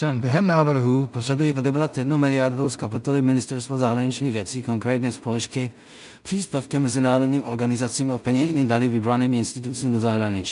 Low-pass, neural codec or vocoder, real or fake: 10.8 kHz; codec, 16 kHz in and 24 kHz out, 0.4 kbps, LongCat-Audio-Codec, two codebook decoder; fake